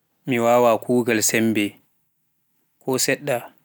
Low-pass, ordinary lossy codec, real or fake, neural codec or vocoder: none; none; real; none